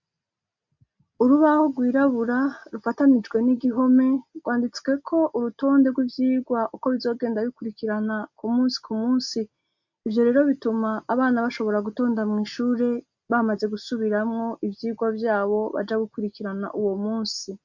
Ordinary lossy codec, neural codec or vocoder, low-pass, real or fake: MP3, 64 kbps; none; 7.2 kHz; real